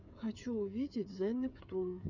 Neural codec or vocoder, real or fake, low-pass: codec, 16 kHz, 16 kbps, FreqCodec, smaller model; fake; 7.2 kHz